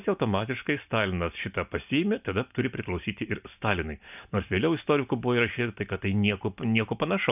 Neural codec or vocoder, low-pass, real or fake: none; 3.6 kHz; real